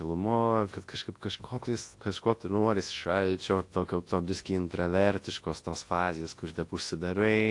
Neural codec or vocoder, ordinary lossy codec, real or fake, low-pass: codec, 24 kHz, 0.9 kbps, WavTokenizer, large speech release; AAC, 48 kbps; fake; 10.8 kHz